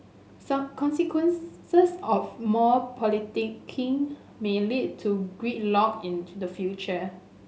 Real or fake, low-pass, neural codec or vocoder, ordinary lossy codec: real; none; none; none